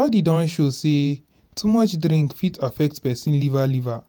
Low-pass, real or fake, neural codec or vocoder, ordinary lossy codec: none; fake; vocoder, 48 kHz, 128 mel bands, Vocos; none